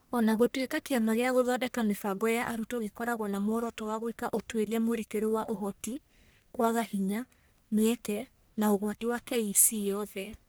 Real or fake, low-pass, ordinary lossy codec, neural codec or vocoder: fake; none; none; codec, 44.1 kHz, 1.7 kbps, Pupu-Codec